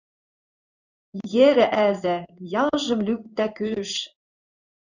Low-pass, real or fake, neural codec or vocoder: 7.2 kHz; fake; codec, 16 kHz in and 24 kHz out, 1 kbps, XY-Tokenizer